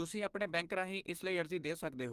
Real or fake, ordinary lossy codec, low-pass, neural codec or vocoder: fake; Opus, 32 kbps; 14.4 kHz; codec, 44.1 kHz, 2.6 kbps, SNAC